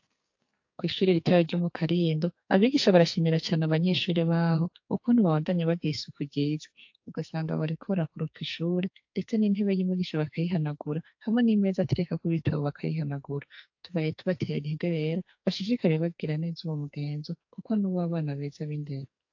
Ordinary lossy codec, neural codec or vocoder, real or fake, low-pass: AAC, 48 kbps; codec, 44.1 kHz, 2.6 kbps, SNAC; fake; 7.2 kHz